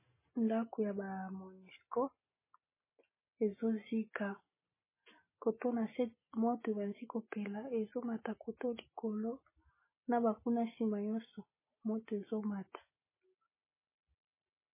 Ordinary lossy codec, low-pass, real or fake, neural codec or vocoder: MP3, 16 kbps; 3.6 kHz; real; none